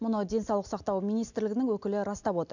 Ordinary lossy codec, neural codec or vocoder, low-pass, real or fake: none; none; 7.2 kHz; real